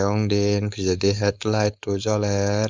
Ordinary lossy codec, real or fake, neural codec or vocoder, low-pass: Opus, 32 kbps; fake; codec, 16 kHz, 4.8 kbps, FACodec; 7.2 kHz